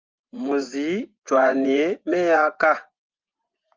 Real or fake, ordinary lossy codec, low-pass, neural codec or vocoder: fake; Opus, 32 kbps; 7.2 kHz; vocoder, 24 kHz, 100 mel bands, Vocos